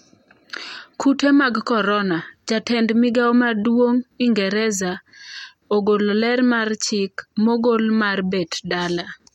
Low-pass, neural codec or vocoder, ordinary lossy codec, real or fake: 19.8 kHz; none; MP3, 64 kbps; real